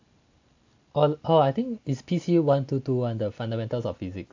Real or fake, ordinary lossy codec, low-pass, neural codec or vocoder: real; AAC, 48 kbps; 7.2 kHz; none